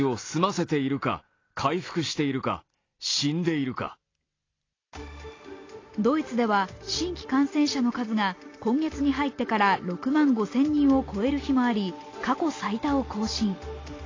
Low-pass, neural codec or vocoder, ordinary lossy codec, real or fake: 7.2 kHz; none; AAC, 32 kbps; real